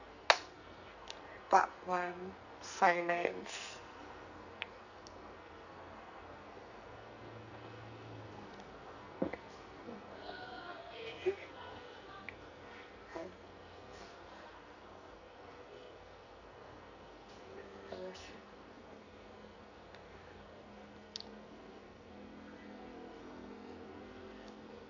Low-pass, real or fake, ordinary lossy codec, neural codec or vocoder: 7.2 kHz; fake; none; codec, 44.1 kHz, 2.6 kbps, SNAC